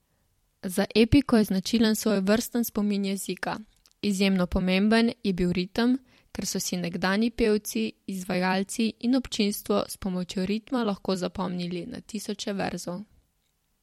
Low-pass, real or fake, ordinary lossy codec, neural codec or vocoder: 19.8 kHz; fake; MP3, 64 kbps; vocoder, 44.1 kHz, 128 mel bands every 256 samples, BigVGAN v2